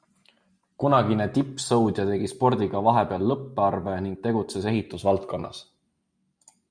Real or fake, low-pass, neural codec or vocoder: real; 9.9 kHz; none